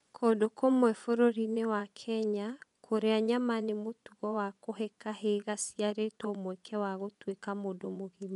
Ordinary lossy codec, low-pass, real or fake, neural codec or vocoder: none; 10.8 kHz; fake; vocoder, 24 kHz, 100 mel bands, Vocos